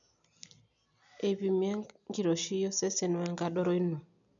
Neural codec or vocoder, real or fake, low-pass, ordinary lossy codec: none; real; 7.2 kHz; none